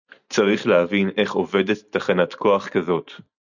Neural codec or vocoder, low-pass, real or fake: none; 7.2 kHz; real